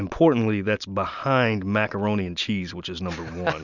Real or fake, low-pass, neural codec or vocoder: real; 7.2 kHz; none